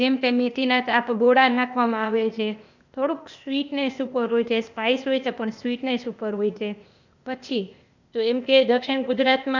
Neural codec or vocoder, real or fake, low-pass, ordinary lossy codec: codec, 16 kHz, 0.8 kbps, ZipCodec; fake; 7.2 kHz; none